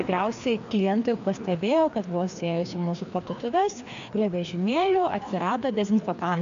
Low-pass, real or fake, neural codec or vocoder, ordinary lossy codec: 7.2 kHz; fake; codec, 16 kHz, 2 kbps, FreqCodec, larger model; MP3, 64 kbps